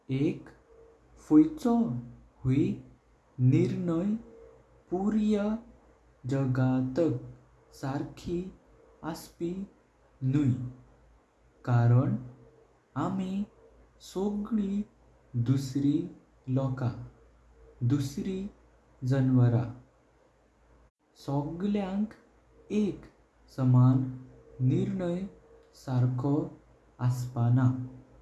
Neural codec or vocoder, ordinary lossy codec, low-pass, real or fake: none; none; none; real